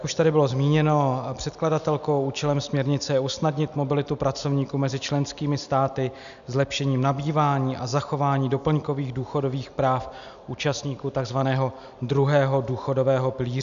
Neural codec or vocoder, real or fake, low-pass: none; real; 7.2 kHz